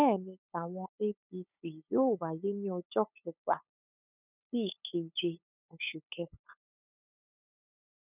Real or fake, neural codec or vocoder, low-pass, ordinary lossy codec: fake; codec, 16 kHz, 8 kbps, FunCodec, trained on LibriTTS, 25 frames a second; 3.6 kHz; none